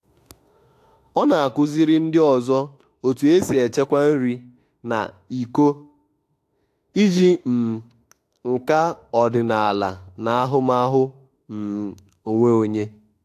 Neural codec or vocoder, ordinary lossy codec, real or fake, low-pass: autoencoder, 48 kHz, 32 numbers a frame, DAC-VAE, trained on Japanese speech; AAC, 64 kbps; fake; 14.4 kHz